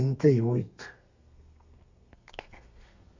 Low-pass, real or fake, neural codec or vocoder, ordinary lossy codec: 7.2 kHz; fake; codec, 32 kHz, 1.9 kbps, SNAC; Opus, 64 kbps